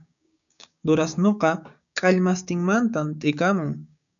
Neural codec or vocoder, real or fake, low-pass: codec, 16 kHz, 6 kbps, DAC; fake; 7.2 kHz